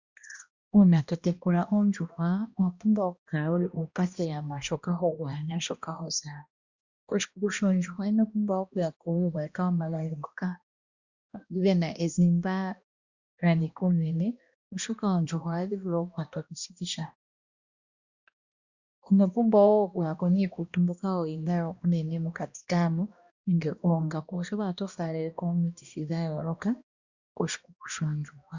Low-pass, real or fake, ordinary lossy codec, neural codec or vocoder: 7.2 kHz; fake; Opus, 64 kbps; codec, 16 kHz, 1 kbps, X-Codec, HuBERT features, trained on balanced general audio